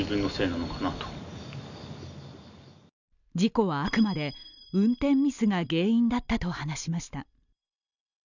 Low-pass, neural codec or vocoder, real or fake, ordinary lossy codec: 7.2 kHz; none; real; none